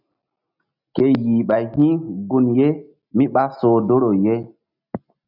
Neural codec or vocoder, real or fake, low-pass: none; real; 5.4 kHz